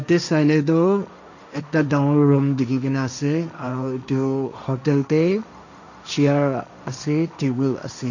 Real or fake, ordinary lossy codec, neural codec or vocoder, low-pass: fake; none; codec, 16 kHz, 1.1 kbps, Voila-Tokenizer; 7.2 kHz